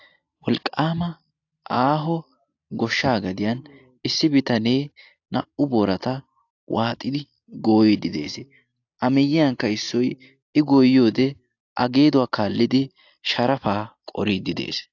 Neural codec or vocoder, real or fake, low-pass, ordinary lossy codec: none; real; 7.2 kHz; AAC, 48 kbps